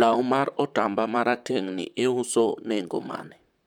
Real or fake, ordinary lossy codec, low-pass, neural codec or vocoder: fake; none; 19.8 kHz; vocoder, 44.1 kHz, 128 mel bands, Pupu-Vocoder